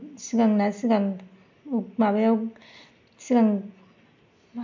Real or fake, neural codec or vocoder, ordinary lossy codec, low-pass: real; none; MP3, 64 kbps; 7.2 kHz